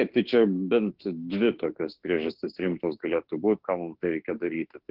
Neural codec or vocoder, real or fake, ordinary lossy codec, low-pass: autoencoder, 48 kHz, 32 numbers a frame, DAC-VAE, trained on Japanese speech; fake; Opus, 16 kbps; 5.4 kHz